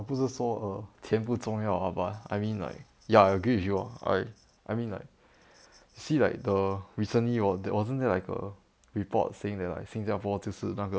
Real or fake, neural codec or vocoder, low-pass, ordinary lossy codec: real; none; none; none